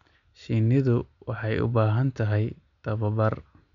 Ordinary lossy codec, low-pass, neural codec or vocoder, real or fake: none; 7.2 kHz; none; real